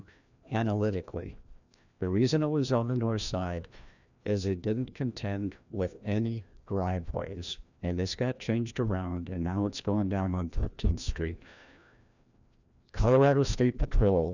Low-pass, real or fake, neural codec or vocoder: 7.2 kHz; fake; codec, 16 kHz, 1 kbps, FreqCodec, larger model